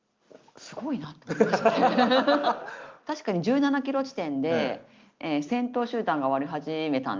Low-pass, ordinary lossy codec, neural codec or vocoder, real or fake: 7.2 kHz; Opus, 24 kbps; none; real